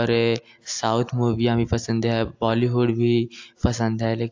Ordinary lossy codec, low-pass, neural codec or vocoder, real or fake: AAC, 48 kbps; 7.2 kHz; none; real